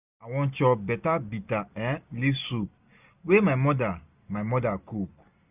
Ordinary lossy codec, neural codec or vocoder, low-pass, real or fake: none; none; 3.6 kHz; real